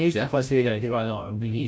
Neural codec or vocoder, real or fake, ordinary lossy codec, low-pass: codec, 16 kHz, 0.5 kbps, FreqCodec, larger model; fake; none; none